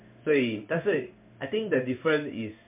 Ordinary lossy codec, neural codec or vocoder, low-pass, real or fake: MP3, 32 kbps; codec, 16 kHz in and 24 kHz out, 1 kbps, XY-Tokenizer; 3.6 kHz; fake